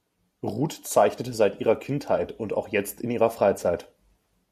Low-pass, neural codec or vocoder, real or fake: 14.4 kHz; none; real